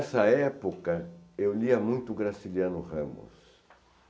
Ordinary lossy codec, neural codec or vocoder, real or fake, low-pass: none; none; real; none